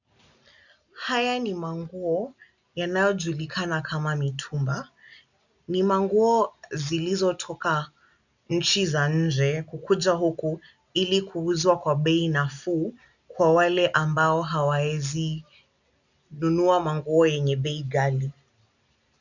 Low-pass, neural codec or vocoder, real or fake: 7.2 kHz; none; real